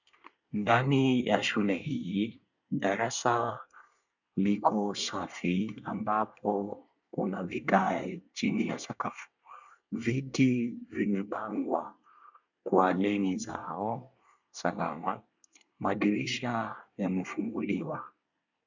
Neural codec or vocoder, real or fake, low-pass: codec, 24 kHz, 1 kbps, SNAC; fake; 7.2 kHz